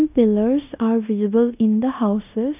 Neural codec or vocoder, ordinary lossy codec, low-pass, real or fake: codec, 16 kHz in and 24 kHz out, 0.9 kbps, LongCat-Audio-Codec, fine tuned four codebook decoder; none; 3.6 kHz; fake